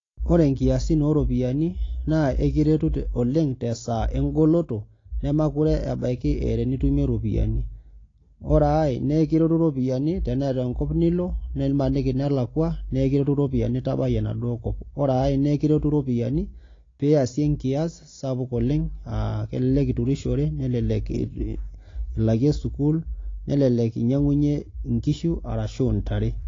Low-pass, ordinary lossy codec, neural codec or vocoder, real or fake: 7.2 kHz; AAC, 32 kbps; none; real